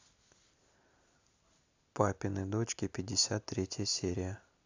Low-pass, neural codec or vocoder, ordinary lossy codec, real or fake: 7.2 kHz; none; AAC, 48 kbps; real